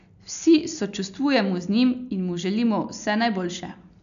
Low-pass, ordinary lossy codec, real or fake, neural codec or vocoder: 7.2 kHz; none; real; none